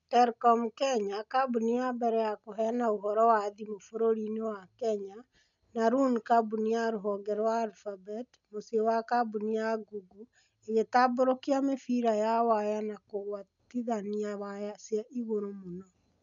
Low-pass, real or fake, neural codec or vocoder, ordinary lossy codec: 7.2 kHz; real; none; none